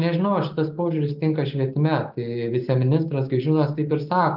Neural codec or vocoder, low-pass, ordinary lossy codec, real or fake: none; 5.4 kHz; Opus, 32 kbps; real